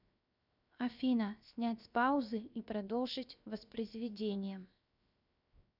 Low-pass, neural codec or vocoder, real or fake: 5.4 kHz; codec, 16 kHz, 0.7 kbps, FocalCodec; fake